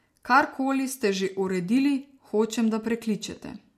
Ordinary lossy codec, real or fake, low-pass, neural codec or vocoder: MP3, 64 kbps; real; 14.4 kHz; none